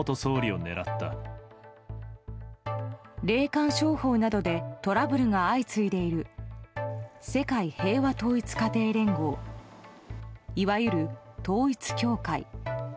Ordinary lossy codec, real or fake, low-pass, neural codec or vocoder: none; real; none; none